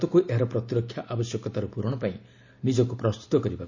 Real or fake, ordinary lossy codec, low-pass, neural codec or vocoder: real; Opus, 64 kbps; 7.2 kHz; none